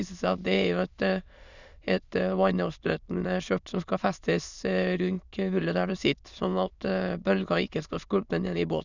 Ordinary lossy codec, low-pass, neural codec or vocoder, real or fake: none; 7.2 kHz; autoencoder, 22.05 kHz, a latent of 192 numbers a frame, VITS, trained on many speakers; fake